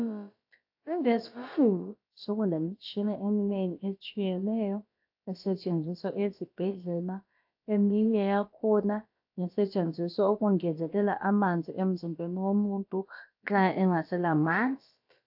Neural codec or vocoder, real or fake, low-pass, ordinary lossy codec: codec, 16 kHz, about 1 kbps, DyCAST, with the encoder's durations; fake; 5.4 kHz; AAC, 48 kbps